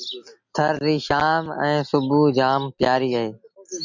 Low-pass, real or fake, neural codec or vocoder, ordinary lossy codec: 7.2 kHz; real; none; MP3, 48 kbps